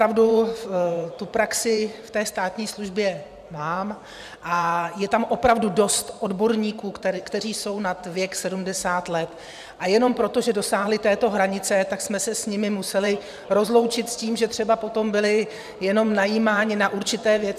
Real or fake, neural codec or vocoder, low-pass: fake; vocoder, 44.1 kHz, 128 mel bands every 512 samples, BigVGAN v2; 14.4 kHz